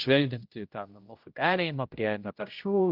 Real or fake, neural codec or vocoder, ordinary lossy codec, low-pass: fake; codec, 16 kHz, 0.5 kbps, X-Codec, HuBERT features, trained on general audio; Opus, 24 kbps; 5.4 kHz